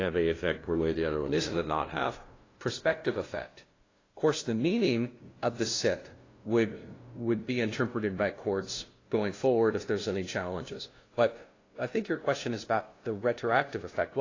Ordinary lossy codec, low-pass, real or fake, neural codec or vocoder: AAC, 32 kbps; 7.2 kHz; fake; codec, 16 kHz, 0.5 kbps, FunCodec, trained on LibriTTS, 25 frames a second